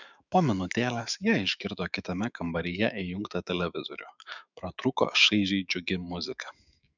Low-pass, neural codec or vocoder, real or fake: 7.2 kHz; autoencoder, 48 kHz, 128 numbers a frame, DAC-VAE, trained on Japanese speech; fake